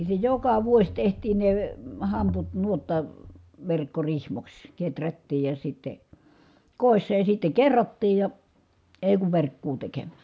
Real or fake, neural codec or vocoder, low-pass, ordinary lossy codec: real; none; none; none